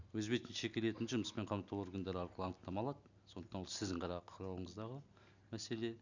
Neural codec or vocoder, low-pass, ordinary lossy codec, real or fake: none; 7.2 kHz; none; real